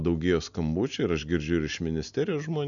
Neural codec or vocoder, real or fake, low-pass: none; real; 7.2 kHz